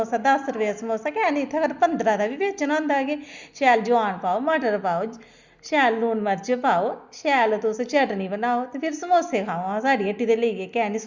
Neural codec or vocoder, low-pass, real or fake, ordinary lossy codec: none; 7.2 kHz; real; Opus, 64 kbps